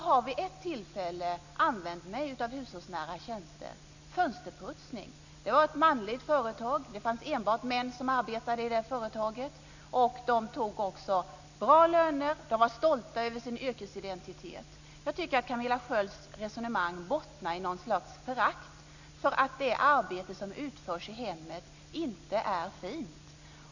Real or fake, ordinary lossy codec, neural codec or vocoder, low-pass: real; none; none; 7.2 kHz